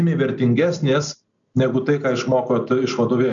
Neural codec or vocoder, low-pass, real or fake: none; 7.2 kHz; real